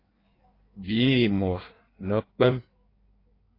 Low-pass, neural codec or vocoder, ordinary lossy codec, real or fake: 5.4 kHz; codec, 16 kHz in and 24 kHz out, 1.1 kbps, FireRedTTS-2 codec; AAC, 24 kbps; fake